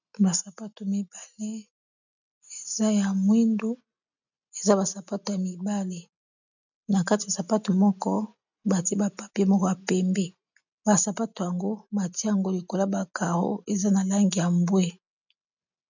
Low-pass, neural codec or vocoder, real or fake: 7.2 kHz; none; real